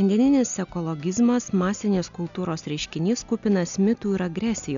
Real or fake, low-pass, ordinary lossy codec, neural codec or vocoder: real; 7.2 kHz; MP3, 96 kbps; none